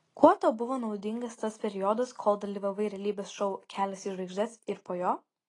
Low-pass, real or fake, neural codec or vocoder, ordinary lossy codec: 10.8 kHz; real; none; AAC, 32 kbps